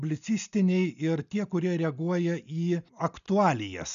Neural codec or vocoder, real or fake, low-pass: none; real; 7.2 kHz